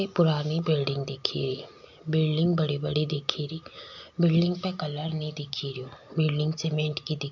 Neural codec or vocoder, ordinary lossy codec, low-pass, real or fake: none; none; 7.2 kHz; real